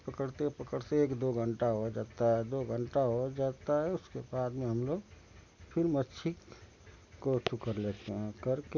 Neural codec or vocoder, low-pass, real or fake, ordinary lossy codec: none; 7.2 kHz; real; none